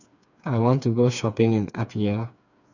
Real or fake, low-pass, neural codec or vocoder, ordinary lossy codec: fake; 7.2 kHz; codec, 16 kHz, 4 kbps, FreqCodec, smaller model; none